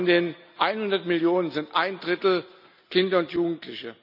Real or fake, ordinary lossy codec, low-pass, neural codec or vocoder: real; none; 5.4 kHz; none